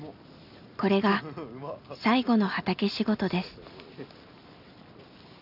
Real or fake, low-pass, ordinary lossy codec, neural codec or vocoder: real; 5.4 kHz; none; none